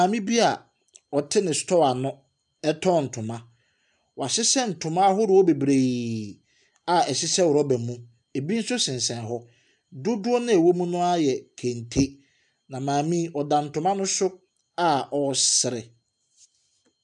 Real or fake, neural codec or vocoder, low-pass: real; none; 10.8 kHz